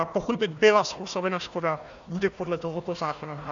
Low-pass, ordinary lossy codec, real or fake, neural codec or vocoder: 7.2 kHz; AAC, 64 kbps; fake; codec, 16 kHz, 1 kbps, FunCodec, trained on Chinese and English, 50 frames a second